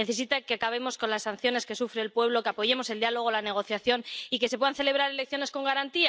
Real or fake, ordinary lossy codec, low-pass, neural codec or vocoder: real; none; none; none